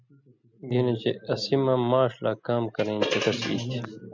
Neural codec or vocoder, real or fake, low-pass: none; real; 7.2 kHz